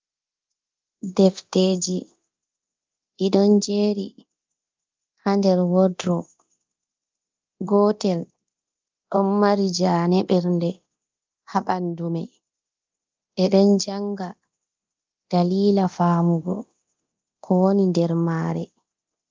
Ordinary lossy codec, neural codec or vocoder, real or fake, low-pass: Opus, 32 kbps; codec, 24 kHz, 0.9 kbps, DualCodec; fake; 7.2 kHz